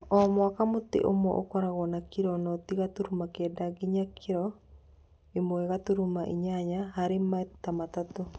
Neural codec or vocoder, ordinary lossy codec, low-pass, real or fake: none; none; none; real